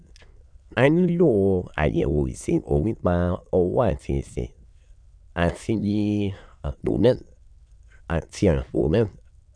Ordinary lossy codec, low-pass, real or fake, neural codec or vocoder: none; 9.9 kHz; fake; autoencoder, 22.05 kHz, a latent of 192 numbers a frame, VITS, trained on many speakers